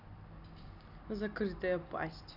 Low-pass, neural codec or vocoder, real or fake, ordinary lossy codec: 5.4 kHz; none; real; none